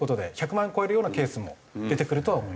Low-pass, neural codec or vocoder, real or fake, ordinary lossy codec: none; none; real; none